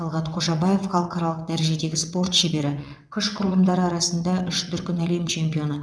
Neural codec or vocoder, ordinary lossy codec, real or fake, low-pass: vocoder, 22.05 kHz, 80 mel bands, WaveNeXt; none; fake; none